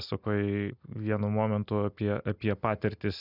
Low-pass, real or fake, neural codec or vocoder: 5.4 kHz; real; none